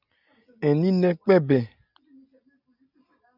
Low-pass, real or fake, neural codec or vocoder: 5.4 kHz; real; none